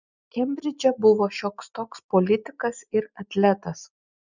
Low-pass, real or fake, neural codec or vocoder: 7.2 kHz; real; none